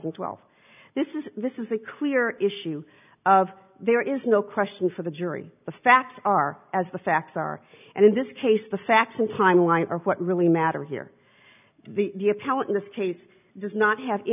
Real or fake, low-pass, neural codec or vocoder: real; 3.6 kHz; none